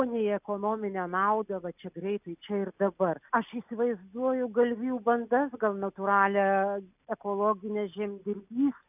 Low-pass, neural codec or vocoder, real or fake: 3.6 kHz; none; real